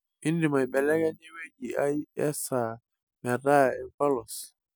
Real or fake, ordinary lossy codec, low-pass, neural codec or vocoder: real; none; none; none